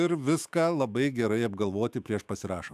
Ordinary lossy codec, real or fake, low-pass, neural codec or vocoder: AAC, 96 kbps; fake; 14.4 kHz; autoencoder, 48 kHz, 128 numbers a frame, DAC-VAE, trained on Japanese speech